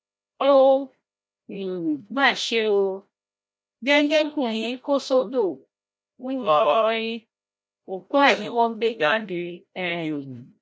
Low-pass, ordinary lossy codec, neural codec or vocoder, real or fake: none; none; codec, 16 kHz, 0.5 kbps, FreqCodec, larger model; fake